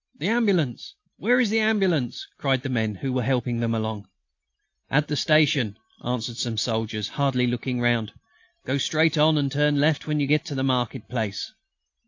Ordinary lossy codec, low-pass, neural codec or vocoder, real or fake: AAC, 48 kbps; 7.2 kHz; none; real